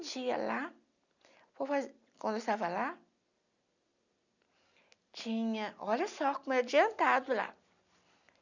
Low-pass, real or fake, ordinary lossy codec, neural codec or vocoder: 7.2 kHz; real; none; none